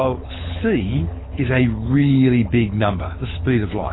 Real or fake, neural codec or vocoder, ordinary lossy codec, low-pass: fake; codec, 24 kHz, 6 kbps, HILCodec; AAC, 16 kbps; 7.2 kHz